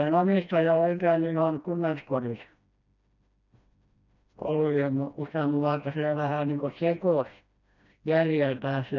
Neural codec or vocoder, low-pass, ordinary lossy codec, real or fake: codec, 16 kHz, 1 kbps, FreqCodec, smaller model; 7.2 kHz; none; fake